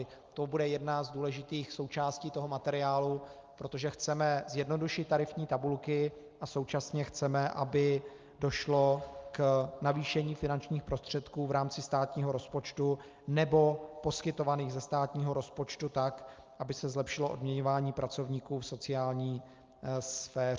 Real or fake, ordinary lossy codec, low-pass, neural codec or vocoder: real; Opus, 24 kbps; 7.2 kHz; none